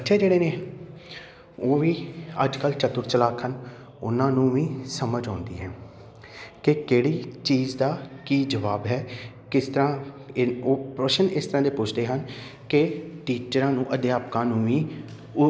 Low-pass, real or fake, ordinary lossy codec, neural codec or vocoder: none; real; none; none